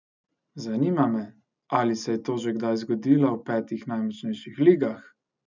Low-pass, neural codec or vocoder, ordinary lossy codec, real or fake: 7.2 kHz; none; none; real